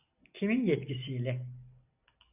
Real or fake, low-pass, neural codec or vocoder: real; 3.6 kHz; none